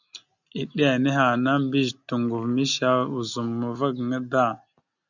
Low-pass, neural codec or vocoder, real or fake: 7.2 kHz; none; real